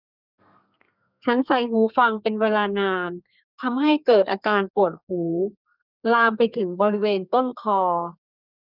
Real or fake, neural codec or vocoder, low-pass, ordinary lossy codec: fake; codec, 32 kHz, 1.9 kbps, SNAC; 5.4 kHz; none